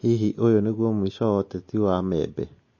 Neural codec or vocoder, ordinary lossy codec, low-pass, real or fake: none; MP3, 32 kbps; 7.2 kHz; real